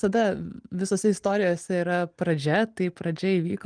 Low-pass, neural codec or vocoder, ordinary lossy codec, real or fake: 9.9 kHz; none; Opus, 24 kbps; real